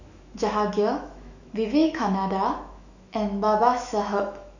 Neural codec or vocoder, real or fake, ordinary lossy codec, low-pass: autoencoder, 48 kHz, 128 numbers a frame, DAC-VAE, trained on Japanese speech; fake; none; 7.2 kHz